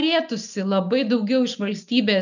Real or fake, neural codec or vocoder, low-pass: real; none; 7.2 kHz